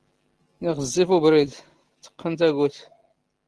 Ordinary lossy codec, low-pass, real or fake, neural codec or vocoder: Opus, 16 kbps; 10.8 kHz; real; none